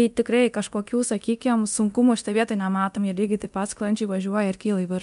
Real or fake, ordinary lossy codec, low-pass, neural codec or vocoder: fake; MP3, 96 kbps; 10.8 kHz; codec, 24 kHz, 0.9 kbps, DualCodec